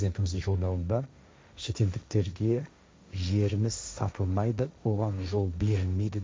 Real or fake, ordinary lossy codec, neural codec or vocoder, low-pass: fake; none; codec, 16 kHz, 1.1 kbps, Voila-Tokenizer; none